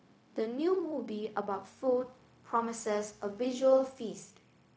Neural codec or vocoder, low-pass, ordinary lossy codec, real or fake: codec, 16 kHz, 0.4 kbps, LongCat-Audio-Codec; none; none; fake